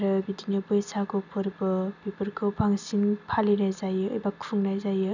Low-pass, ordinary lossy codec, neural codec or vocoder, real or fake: 7.2 kHz; none; none; real